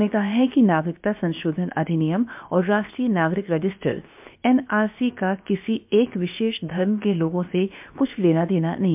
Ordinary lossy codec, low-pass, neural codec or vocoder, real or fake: MP3, 32 kbps; 3.6 kHz; codec, 16 kHz, about 1 kbps, DyCAST, with the encoder's durations; fake